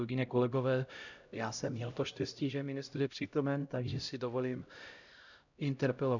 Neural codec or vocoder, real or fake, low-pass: codec, 16 kHz, 0.5 kbps, X-Codec, HuBERT features, trained on LibriSpeech; fake; 7.2 kHz